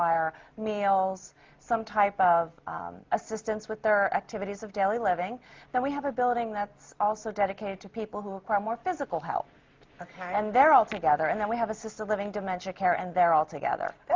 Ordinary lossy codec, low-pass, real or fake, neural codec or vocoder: Opus, 24 kbps; 7.2 kHz; real; none